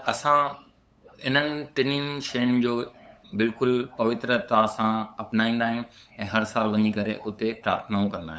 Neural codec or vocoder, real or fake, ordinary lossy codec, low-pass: codec, 16 kHz, 8 kbps, FunCodec, trained on LibriTTS, 25 frames a second; fake; none; none